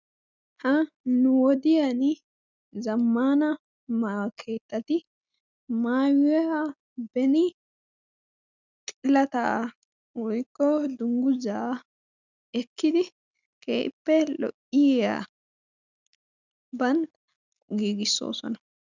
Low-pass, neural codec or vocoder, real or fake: 7.2 kHz; none; real